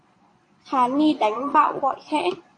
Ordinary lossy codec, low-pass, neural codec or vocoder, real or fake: AAC, 48 kbps; 9.9 kHz; vocoder, 22.05 kHz, 80 mel bands, Vocos; fake